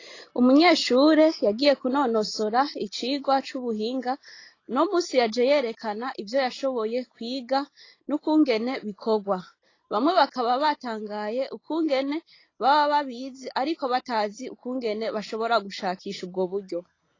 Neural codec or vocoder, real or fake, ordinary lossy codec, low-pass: none; real; AAC, 32 kbps; 7.2 kHz